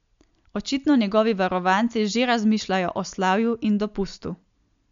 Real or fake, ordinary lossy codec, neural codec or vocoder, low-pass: real; MP3, 64 kbps; none; 7.2 kHz